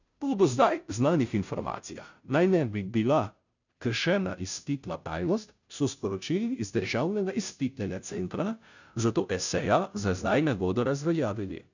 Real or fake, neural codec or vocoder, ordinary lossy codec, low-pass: fake; codec, 16 kHz, 0.5 kbps, FunCodec, trained on Chinese and English, 25 frames a second; none; 7.2 kHz